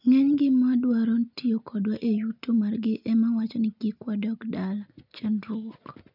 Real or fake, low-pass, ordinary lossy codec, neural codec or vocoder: real; 5.4 kHz; none; none